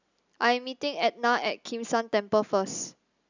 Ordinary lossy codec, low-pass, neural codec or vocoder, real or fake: none; 7.2 kHz; none; real